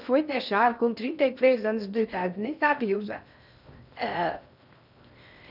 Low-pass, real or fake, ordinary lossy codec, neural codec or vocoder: 5.4 kHz; fake; none; codec, 16 kHz in and 24 kHz out, 0.6 kbps, FocalCodec, streaming, 2048 codes